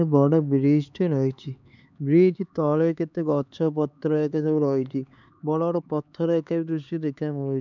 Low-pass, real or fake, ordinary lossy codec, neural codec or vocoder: 7.2 kHz; fake; none; codec, 16 kHz, 4 kbps, X-Codec, HuBERT features, trained on LibriSpeech